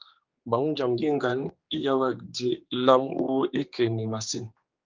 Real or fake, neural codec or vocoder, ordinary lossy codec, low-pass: fake; codec, 16 kHz, 2 kbps, X-Codec, HuBERT features, trained on general audio; Opus, 24 kbps; 7.2 kHz